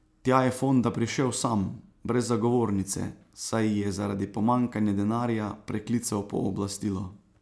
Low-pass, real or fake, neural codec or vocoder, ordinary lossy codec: none; real; none; none